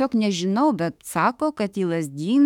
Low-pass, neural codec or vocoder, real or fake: 19.8 kHz; autoencoder, 48 kHz, 32 numbers a frame, DAC-VAE, trained on Japanese speech; fake